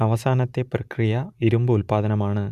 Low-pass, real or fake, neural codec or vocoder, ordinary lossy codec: 14.4 kHz; real; none; none